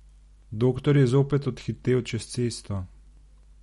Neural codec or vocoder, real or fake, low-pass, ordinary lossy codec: none; real; 14.4 kHz; MP3, 48 kbps